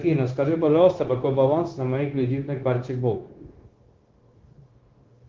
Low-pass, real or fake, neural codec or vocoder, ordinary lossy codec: 7.2 kHz; fake; codec, 16 kHz, 0.9 kbps, LongCat-Audio-Codec; Opus, 16 kbps